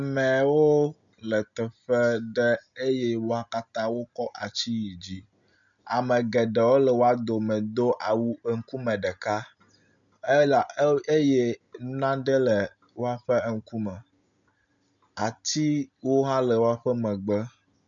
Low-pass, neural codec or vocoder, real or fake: 7.2 kHz; none; real